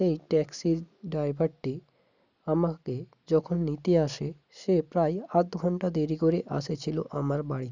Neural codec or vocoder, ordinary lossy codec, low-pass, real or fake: none; none; 7.2 kHz; real